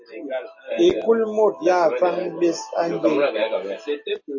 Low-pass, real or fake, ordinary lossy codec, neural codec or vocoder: 7.2 kHz; real; MP3, 32 kbps; none